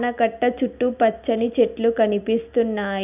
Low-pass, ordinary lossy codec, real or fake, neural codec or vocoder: 3.6 kHz; none; real; none